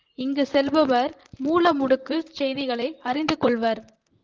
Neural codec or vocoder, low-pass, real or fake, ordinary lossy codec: vocoder, 44.1 kHz, 128 mel bands, Pupu-Vocoder; 7.2 kHz; fake; Opus, 32 kbps